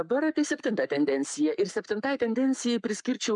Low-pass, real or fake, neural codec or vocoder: 10.8 kHz; fake; codec, 44.1 kHz, 7.8 kbps, Pupu-Codec